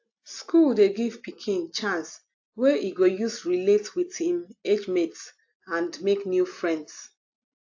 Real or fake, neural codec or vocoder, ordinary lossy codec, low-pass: real; none; AAC, 48 kbps; 7.2 kHz